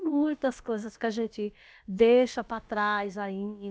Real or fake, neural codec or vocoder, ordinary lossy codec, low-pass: fake; codec, 16 kHz, about 1 kbps, DyCAST, with the encoder's durations; none; none